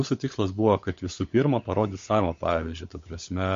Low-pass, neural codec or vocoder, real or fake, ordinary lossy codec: 7.2 kHz; codec, 16 kHz, 16 kbps, FunCodec, trained on LibriTTS, 50 frames a second; fake; MP3, 48 kbps